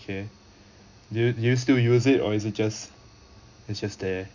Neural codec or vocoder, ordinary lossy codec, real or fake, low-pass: none; none; real; 7.2 kHz